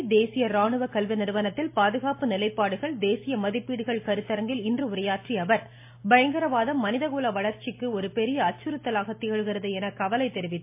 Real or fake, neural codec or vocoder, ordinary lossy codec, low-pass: real; none; MP3, 24 kbps; 3.6 kHz